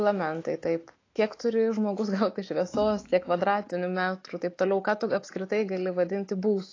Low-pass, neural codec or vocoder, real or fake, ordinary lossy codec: 7.2 kHz; autoencoder, 48 kHz, 128 numbers a frame, DAC-VAE, trained on Japanese speech; fake; AAC, 32 kbps